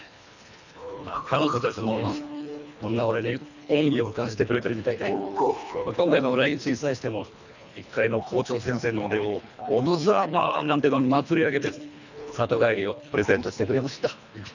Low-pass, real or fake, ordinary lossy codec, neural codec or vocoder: 7.2 kHz; fake; none; codec, 24 kHz, 1.5 kbps, HILCodec